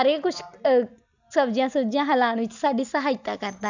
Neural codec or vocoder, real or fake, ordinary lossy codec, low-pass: none; real; none; 7.2 kHz